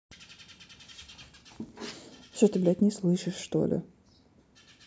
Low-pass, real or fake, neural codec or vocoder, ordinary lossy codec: none; real; none; none